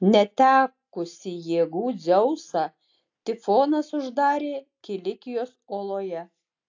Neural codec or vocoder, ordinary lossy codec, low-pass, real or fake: none; AAC, 48 kbps; 7.2 kHz; real